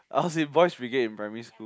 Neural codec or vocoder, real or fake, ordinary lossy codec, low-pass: none; real; none; none